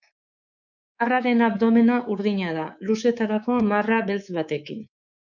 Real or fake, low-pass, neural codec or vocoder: fake; 7.2 kHz; codec, 24 kHz, 3.1 kbps, DualCodec